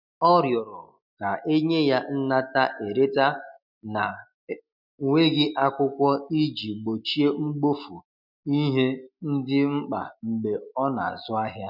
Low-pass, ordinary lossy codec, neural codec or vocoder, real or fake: 5.4 kHz; none; none; real